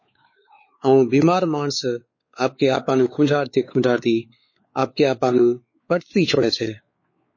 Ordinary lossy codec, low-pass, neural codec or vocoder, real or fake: MP3, 32 kbps; 7.2 kHz; codec, 16 kHz, 4 kbps, X-Codec, WavLM features, trained on Multilingual LibriSpeech; fake